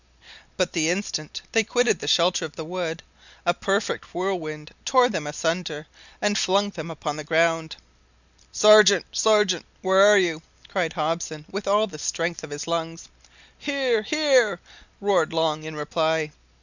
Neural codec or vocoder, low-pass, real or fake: none; 7.2 kHz; real